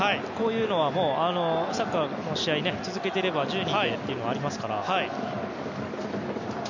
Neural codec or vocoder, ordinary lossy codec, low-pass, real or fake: none; none; 7.2 kHz; real